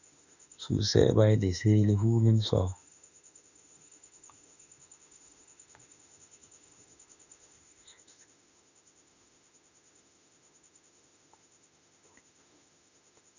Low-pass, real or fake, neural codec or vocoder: 7.2 kHz; fake; autoencoder, 48 kHz, 32 numbers a frame, DAC-VAE, trained on Japanese speech